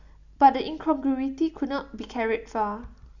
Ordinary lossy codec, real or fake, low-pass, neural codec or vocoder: none; real; 7.2 kHz; none